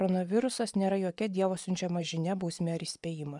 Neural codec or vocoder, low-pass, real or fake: vocoder, 24 kHz, 100 mel bands, Vocos; 10.8 kHz; fake